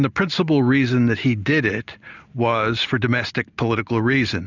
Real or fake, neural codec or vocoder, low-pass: real; none; 7.2 kHz